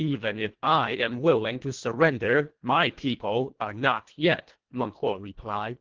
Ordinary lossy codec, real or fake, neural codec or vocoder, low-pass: Opus, 16 kbps; fake; codec, 24 kHz, 1.5 kbps, HILCodec; 7.2 kHz